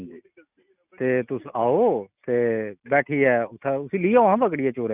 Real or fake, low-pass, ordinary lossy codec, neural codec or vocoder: real; 3.6 kHz; none; none